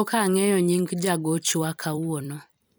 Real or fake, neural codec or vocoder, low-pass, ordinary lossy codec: fake; vocoder, 44.1 kHz, 128 mel bands every 256 samples, BigVGAN v2; none; none